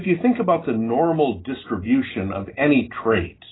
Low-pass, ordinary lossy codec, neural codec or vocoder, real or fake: 7.2 kHz; AAC, 16 kbps; none; real